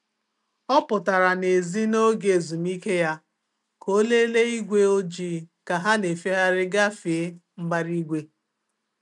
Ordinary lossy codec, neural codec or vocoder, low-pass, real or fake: none; none; 10.8 kHz; real